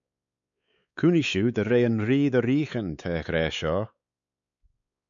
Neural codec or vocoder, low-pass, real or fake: codec, 16 kHz, 4 kbps, X-Codec, WavLM features, trained on Multilingual LibriSpeech; 7.2 kHz; fake